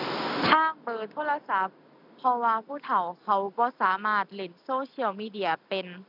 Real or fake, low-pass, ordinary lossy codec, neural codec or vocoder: real; 5.4 kHz; none; none